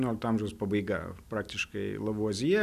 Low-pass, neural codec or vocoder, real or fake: 14.4 kHz; none; real